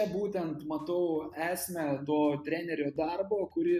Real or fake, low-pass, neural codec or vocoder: real; 14.4 kHz; none